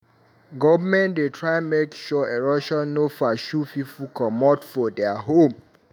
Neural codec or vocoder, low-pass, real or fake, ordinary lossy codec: autoencoder, 48 kHz, 128 numbers a frame, DAC-VAE, trained on Japanese speech; none; fake; none